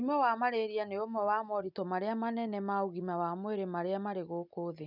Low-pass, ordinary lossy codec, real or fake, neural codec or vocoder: 5.4 kHz; none; real; none